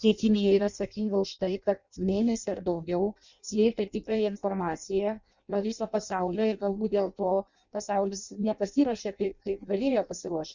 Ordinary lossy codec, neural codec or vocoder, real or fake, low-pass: Opus, 64 kbps; codec, 16 kHz in and 24 kHz out, 0.6 kbps, FireRedTTS-2 codec; fake; 7.2 kHz